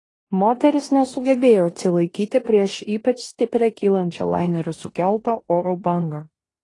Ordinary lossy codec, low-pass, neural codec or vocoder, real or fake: AAC, 32 kbps; 10.8 kHz; codec, 16 kHz in and 24 kHz out, 0.9 kbps, LongCat-Audio-Codec, four codebook decoder; fake